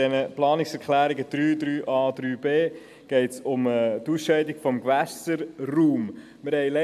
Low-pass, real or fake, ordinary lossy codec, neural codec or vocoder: 14.4 kHz; real; AAC, 96 kbps; none